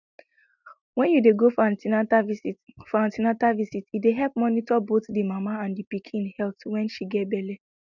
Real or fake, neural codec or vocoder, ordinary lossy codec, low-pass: real; none; none; 7.2 kHz